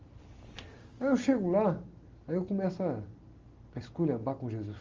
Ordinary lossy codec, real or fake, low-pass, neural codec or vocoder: Opus, 32 kbps; real; 7.2 kHz; none